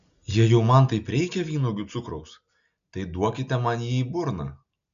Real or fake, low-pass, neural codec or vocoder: real; 7.2 kHz; none